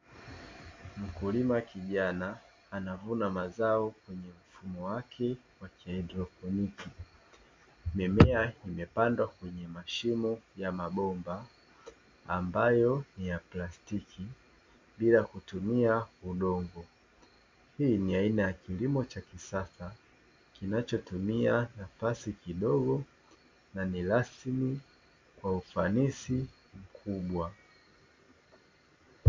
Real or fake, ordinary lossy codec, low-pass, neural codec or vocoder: real; MP3, 48 kbps; 7.2 kHz; none